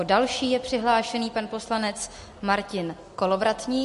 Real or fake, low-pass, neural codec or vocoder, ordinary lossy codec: real; 14.4 kHz; none; MP3, 48 kbps